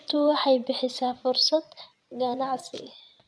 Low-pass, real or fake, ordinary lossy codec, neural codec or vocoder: none; fake; none; vocoder, 22.05 kHz, 80 mel bands, Vocos